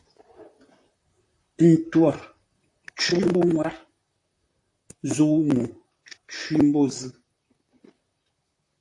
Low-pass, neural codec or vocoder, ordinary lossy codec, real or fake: 10.8 kHz; vocoder, 44.1 kHz, 128 mel bands, Pupu-Vocoder; AAC, 48 kbps; fake